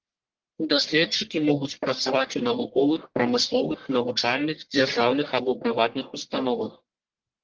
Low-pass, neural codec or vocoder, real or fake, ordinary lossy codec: 7.2 kHz; codec, 44.1 kHz, 1.7 kbps, Pupu-Codec; fake; Opus, 16 kbps